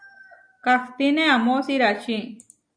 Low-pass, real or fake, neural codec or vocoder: 9.9 kHz; real; none